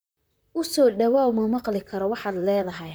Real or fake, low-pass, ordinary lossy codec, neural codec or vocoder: fake; none; none; vocoder, 44.1 kHz, 128 mel bands, Pupu-Vocoder